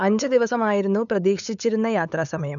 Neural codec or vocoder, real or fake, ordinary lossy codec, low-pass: codec, 16 kHz, 8 kbps, FunCodec, trained on LibriTTS, 25 frames a second; fake; none; 7.2 kHz